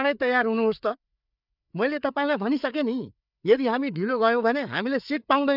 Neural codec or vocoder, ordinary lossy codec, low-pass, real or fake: codec, 16 kHz, 4 kbps, FreqCodec, larger model; none; 5.4 kHz; fake